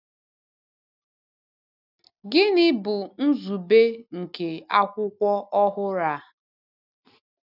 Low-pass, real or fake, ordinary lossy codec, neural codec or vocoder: 5.4 kHz; real; none; none